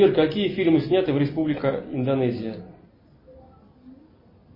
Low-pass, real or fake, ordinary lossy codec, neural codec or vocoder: 5.4 kHz; real; MP3, 24 kbps; none